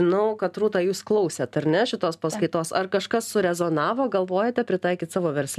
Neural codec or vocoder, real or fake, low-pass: none; real; 14.4 kHz